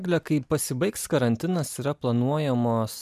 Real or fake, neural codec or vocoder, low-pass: real; none; 14.4 kHz